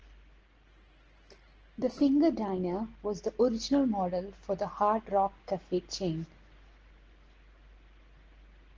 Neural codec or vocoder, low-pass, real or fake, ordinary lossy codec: none; 7.2 kHz; real; Opus, 16 kbps